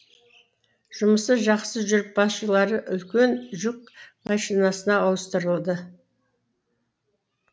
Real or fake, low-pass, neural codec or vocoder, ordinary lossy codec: real; none; none; none